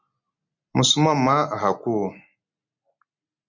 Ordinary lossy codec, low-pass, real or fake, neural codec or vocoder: MP3, 48 kbps; 7.2 kHz; real; none